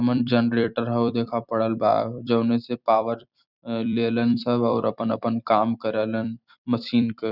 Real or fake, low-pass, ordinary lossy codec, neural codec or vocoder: fake; 5.4 kHz; none; vocoder, 44.1 kHz, 128 mel bands every 256 samples, BigVGAN v2